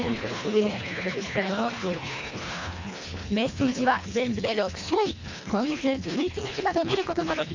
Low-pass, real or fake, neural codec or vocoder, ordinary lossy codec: 7.2 kHz; fake; codec, 24 kHz, 1.5 kbps, HILCodec; MP3, 48 kbps